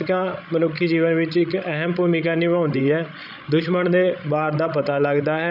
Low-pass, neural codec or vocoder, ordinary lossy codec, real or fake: 5.4 kHz; codec, 16 kHz, 16 kbps, FreqCodec, larger model; none; fake